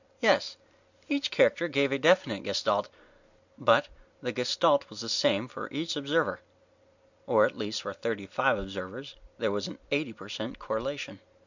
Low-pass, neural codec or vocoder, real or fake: 7.2 kHz; none; real